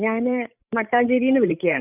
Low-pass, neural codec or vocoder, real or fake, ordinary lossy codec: 3.6 kHz; codec, 16 kHz, 16 kbps, FreqCodec, larger model; fake; none